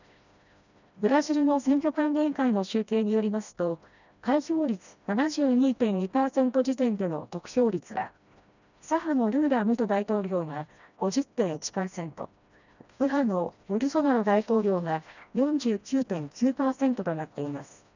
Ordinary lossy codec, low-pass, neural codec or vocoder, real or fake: none; 7.2 kHz; codec, 16 kHz, 1 kbps, FreqCodec, smaller model; fake